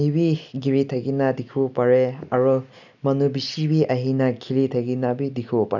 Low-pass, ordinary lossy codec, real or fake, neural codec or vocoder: 7.2 kHz; none; real; none